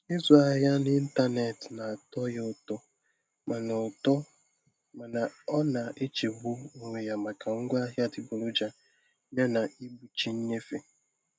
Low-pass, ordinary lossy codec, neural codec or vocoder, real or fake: none; none; none; real